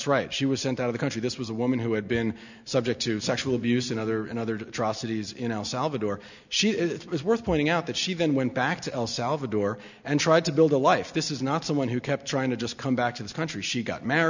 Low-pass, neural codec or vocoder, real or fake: 7.2 kHz; none; real